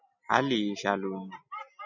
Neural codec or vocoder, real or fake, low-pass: none; real; 7.2 kHz